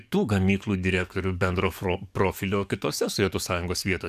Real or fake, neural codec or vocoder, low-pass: fake; codec, 44.1 kHz, 7.8 kbps, DAC; 14.4 kHz